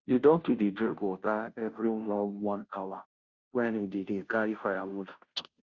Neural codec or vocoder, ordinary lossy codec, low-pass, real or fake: codec, 16 kHz, 0.5 kbps, FunCodec, trained on Chinese and English, 25 frames a second; Opus, 64 kbps; 7.2 kHz; fake